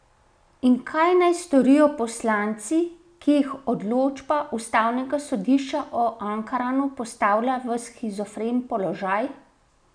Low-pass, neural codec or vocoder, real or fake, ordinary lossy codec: 9.9 kHz; none; real; none